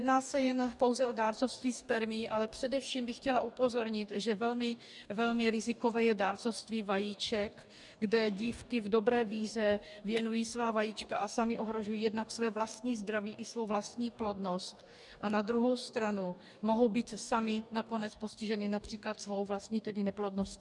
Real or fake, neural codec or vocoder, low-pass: fake; codec, 44.1 kHz, 2.6 kbps, DAC; 10.8 kHz